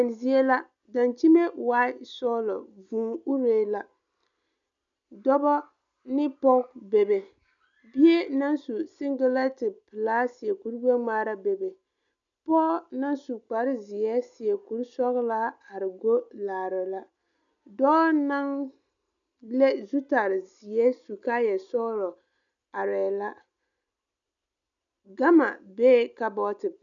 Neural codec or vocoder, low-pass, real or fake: none; 7.2 kHz; real